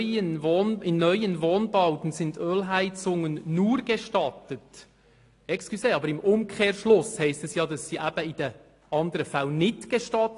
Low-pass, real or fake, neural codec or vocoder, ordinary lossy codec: 10.8 kHz; real; none; AAC, 48 kbps